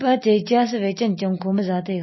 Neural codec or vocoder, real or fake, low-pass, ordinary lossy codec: none; real; 7.2 kHz; MP3, 24 kbps